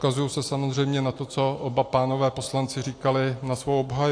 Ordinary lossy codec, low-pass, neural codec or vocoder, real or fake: AAC, 48 kbps; 9.9 kHz; none; real